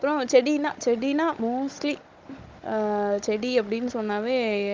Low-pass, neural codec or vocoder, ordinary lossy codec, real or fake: 7.2 kHz; codec, 16 kHz, 16 kbps, FunCodec, trained on Chinese and English, 50 frames a second; Opus, 32 kbps; fake